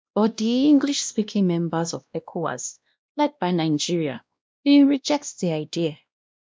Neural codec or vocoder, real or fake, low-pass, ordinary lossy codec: codec, 16 kHz, 1 kbps, X-Codec, WavLM features, trained on Multilingual LibriSpeech; fake; none; none